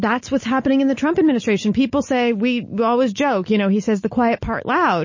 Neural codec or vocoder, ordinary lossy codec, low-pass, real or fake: none; MP3, 32 kbps; 7.2 kHz; real